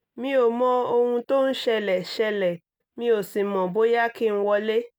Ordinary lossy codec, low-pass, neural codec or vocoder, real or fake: none; none; none; real